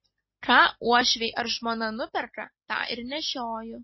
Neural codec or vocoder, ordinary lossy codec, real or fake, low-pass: none; MP3, 24 kbps; real; 7.2 kHz